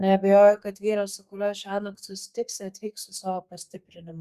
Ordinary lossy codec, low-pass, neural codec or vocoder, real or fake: Opus, 64 kbps; 14.4 kHz; codec, 32 kHz, 1.9 kbps, SNAC; fake